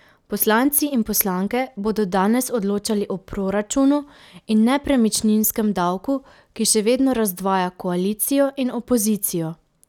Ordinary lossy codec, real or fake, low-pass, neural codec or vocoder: none; real; 19.8 kHz; none